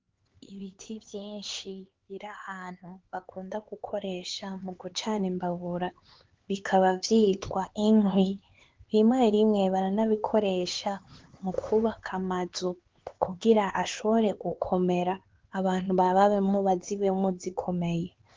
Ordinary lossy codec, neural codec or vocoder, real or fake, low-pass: Opus, 16 kbps; codec, 16 kHz, 4 kbps, X-Codec, HuBERT features, trained on LibriSpeech; fake; 7.2 kHz